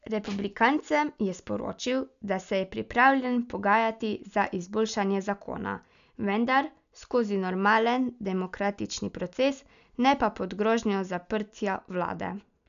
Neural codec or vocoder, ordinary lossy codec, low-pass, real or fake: none; none; 7.2 kHz; real